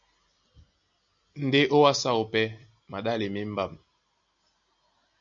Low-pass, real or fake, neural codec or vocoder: 7.2 kHz; real; none